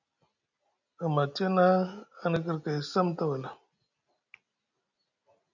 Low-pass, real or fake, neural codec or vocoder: 7.2 kHz; real; none